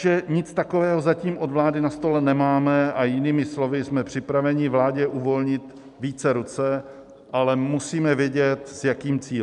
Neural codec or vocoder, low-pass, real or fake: none; 10.8 kHz; real